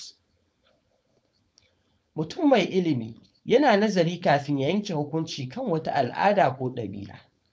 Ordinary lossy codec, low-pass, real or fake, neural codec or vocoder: none; none; fake; codec, 16 kHz, 4.8 kbps, FACodec